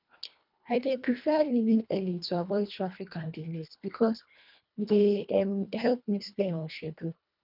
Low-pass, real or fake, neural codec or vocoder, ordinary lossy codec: 5.4 kHz; fake; codec, 24 kHz, 1.5 kbps, HILCodec; none